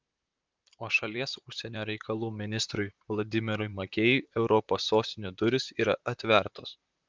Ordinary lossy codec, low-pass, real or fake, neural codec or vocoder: Opus, 24 kbps; 7.2 kHz; fake; vocoder, 44.1 kHz, 128 mel bands, Pupu-Vocoder